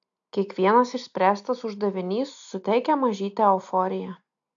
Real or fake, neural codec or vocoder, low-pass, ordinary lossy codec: real; none; 7.2 kHz; AAC, 64 kbps